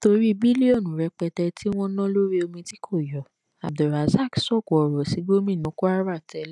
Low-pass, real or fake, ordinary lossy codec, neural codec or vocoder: 10.8 kHz; real; none; none